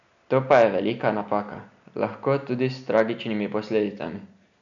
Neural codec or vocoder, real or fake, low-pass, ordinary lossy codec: none; real; 7.2 kHz; none